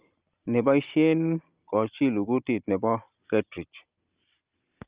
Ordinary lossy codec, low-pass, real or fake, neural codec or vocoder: Opus, 24 kbps; 3.6 kHz; real; none